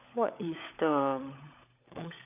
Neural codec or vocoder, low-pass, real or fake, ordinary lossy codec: codec, 16 kHz, 16 kbps, FunCodec, trained on LibriTTS, 50 frames a second; 3.6 kHz; fake; none